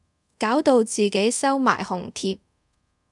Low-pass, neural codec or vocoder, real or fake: 10.8 kHz; codec, 24 kHz, 0.5 kbps, DualCodec; fake